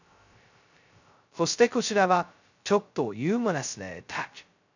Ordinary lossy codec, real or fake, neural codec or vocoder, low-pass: none; fake; codec, 16 kHz, 0.2 kbps, FocalCodec; 7.2 kHz